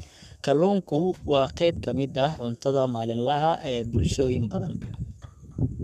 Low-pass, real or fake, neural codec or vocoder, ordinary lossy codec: 14.4 kHz; fake; codec, 32 kHz, 1.9 kbps, SNAC; none